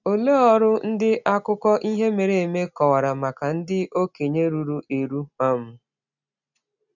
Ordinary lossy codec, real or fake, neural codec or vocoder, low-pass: none; real; none; 7.2 kHz